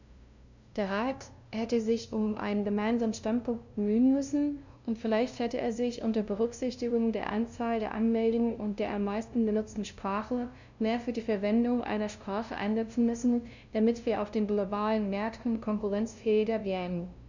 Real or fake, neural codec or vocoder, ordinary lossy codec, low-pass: fake; codec, 16 kHz, 0.5 kbps, FunCodec, trained on LibriTTS, 25 frames a second; none; 7.2 kHz